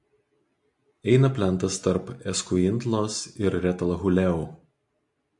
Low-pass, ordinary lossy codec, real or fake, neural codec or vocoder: 10.8 kHz; MP3, 64 kbps; real; none